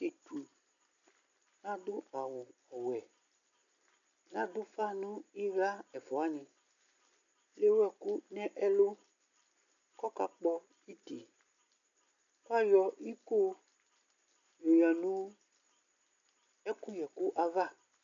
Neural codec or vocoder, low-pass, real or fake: none; 7.2 kHz; real